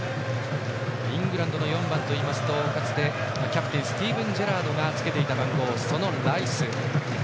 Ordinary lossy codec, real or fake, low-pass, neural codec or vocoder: none; real; none; none